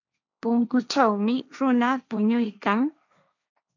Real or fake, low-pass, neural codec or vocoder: fake; 7.2 kHz; codec, 16 kHz, 1.1 kbps, Voila-Tokenizer